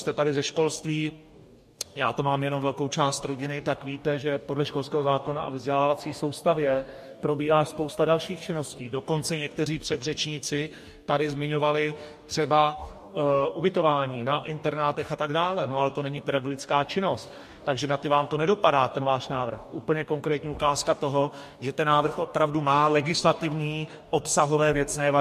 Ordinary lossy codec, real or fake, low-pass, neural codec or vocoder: MP3, 64 kbps; fake; 14.4 kHz; codec, 44.1 kHz, 2.6 kbps, DAC